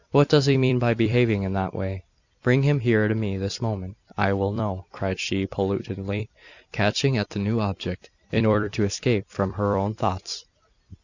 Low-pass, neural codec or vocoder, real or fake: 7.2 kHz; vocoder, 44.1 kHz, 128 mel bands every 256 samples, BigVGAN v2; fake